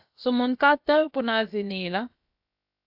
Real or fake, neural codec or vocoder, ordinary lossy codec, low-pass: fake; codec, 16 kHz, about 1 kbps, DyCAST, with the encoder's durations; AAC, 48 kbps; 5.4 kHz